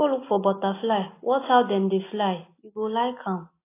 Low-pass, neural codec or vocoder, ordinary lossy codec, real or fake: 3.6 kHz; none; AAC, 24 kbps; real